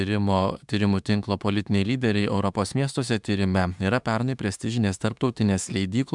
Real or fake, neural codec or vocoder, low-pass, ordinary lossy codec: fake; autoencoder, 48 kHz, 32 numbers a frame, DAC-VAE, trained on Japanese speech; 10.8 kHz; MP3, 96 kbps